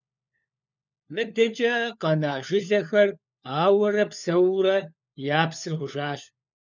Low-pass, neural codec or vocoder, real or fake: 7.2 kHz; codec, 16 kHz, 4 kbps, FunCodec, trained on LibriTTS, 50 frames a second; fake